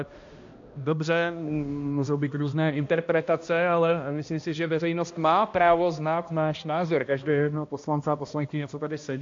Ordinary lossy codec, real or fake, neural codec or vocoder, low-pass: AAC, 48 kbps; fake; codec, 16 kHz, 1 kbps, X-Codec, HuBERT features, trained on balanced general audio; 7.2 kHz